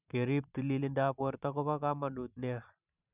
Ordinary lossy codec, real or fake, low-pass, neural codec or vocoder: none; fake; 3.6 kHz; vocoder, 44.1 kHz, 128 mel bands every 512 samples, BigVGAN v2